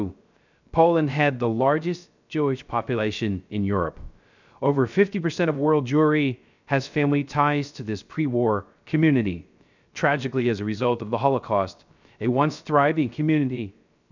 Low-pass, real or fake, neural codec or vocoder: 7.2 kHz; fake; codec, 16 kHz, 0.3 kbps, FocalCodec